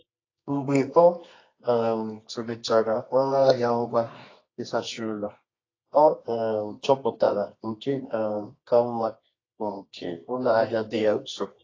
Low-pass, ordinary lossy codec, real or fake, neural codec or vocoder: 7.2 kHz; AAC, 32 kbps; fake; codec, 24 kHz, 0.9 kbps, WavTokenizer, medium music audio release